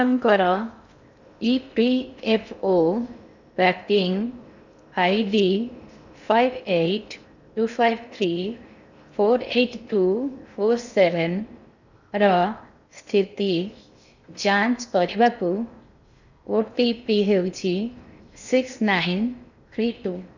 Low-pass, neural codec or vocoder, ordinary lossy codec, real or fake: 7.2 kHz; codec, 16 kHz in and 24 kHz out, 0.6 kbps, FocalCodec, streaming, 2048 codes; none; fake